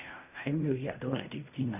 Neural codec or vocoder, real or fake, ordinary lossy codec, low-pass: codec, 16 kHz in and 24 kHz out, 0.4 kbps, LongCat-Audio-Codec, fine tuned four codebook decoder; fake; none; 3.6 kHz